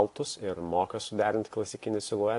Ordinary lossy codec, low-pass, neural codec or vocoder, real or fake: AAC, 48 kbps; 10.8 kHz; none; real